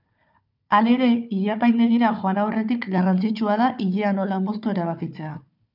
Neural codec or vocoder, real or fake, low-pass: codec, 16 kHz, 4 kbps, FunCodec, trained on Chinese and English, 50 frames a second; fake; 5.4 kHz